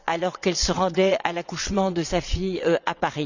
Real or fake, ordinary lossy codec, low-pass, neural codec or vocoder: fake; none; 7.2 kHz; vocoder, 22.05 kHz, 80 mel bands, WaveNeXt